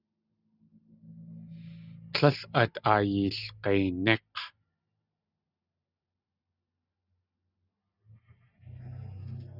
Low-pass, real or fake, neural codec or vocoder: 5.4 kHz; real; none